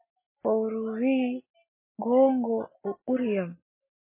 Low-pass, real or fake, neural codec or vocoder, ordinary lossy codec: 3.6 kHz; real; none; MP3, 16 kbps